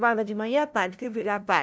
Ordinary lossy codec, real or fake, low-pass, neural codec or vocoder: none; fake; none; codec, 16 kHz, 0.5 kbps, FunCodec, trained on LibriTTS, 25 frames a second